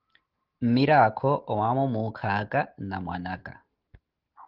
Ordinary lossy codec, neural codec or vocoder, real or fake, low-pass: Opus, 16 kbps; none; real; 5.4 kHz